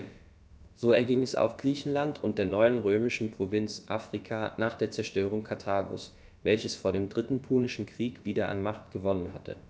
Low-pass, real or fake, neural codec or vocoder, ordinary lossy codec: none; fake; codec, 16 kHz, about 1 kbps, DyCAST, with the encoder's durations; none